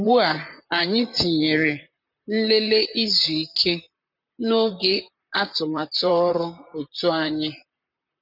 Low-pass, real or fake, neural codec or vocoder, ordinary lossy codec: 5.4 kHz; fake; vocoder, 44.1 kHz, 128 mel bands, Pupu-Vocoder; none